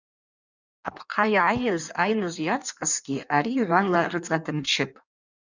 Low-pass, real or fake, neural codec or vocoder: 7.2 kHz; fake; codec, 16 kHz in and 24 kHz out, 1.1 kbps, FireRedTTS-2 codec